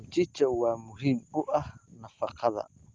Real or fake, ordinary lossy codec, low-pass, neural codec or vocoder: real; Opus, 32 kbps; 7.2 kHz; none